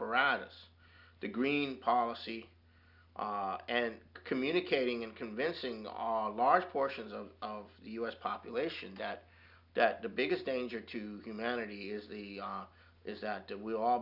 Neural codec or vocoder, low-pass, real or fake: none; 5.4 kHz; real